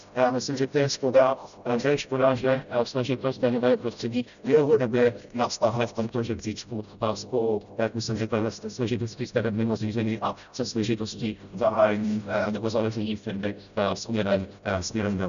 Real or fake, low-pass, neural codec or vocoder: fake; 7.2 kHz; codec, 16 kHz, 0.5 kbps, FreqCodec, smaller model